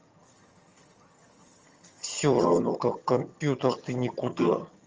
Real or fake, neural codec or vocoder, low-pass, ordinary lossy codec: fake; vocoder, 22.05 kHz, 80 mel bands, HiFi-GAN; 7.2 kHz; Opus, 24 kbps